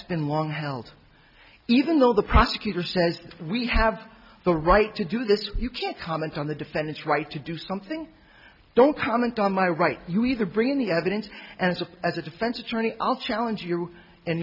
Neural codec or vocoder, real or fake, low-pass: none; real; 5.4 kHz